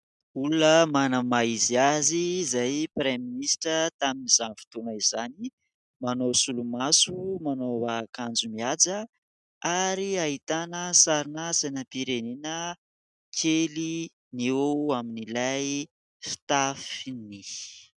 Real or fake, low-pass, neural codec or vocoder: real; 10.8 kHz; none